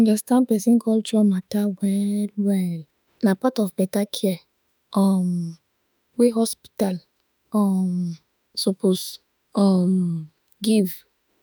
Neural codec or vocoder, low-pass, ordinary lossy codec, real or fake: autoencoder, 48 kHz, 32 numbers a frame, DAC-VAE, trained on Japanese speech; none; none; fake